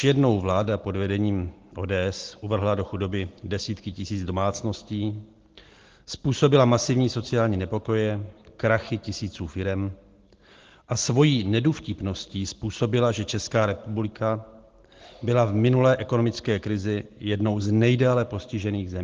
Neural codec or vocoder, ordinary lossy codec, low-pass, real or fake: none; Opus, 16 kbps; 7.2 kHz; real